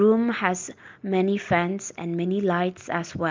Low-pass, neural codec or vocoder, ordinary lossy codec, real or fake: 7.2 kHz; none; Opus, 24 kbps; real